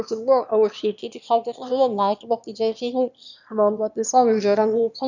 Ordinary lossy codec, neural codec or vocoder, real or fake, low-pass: none; autoencoder, 22.05 kHz, a latent of 192 numbers a frame, VITS, trained on one speaker; fake; 7.2 kHz